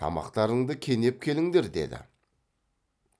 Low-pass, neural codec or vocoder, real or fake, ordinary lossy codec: none; none; real; none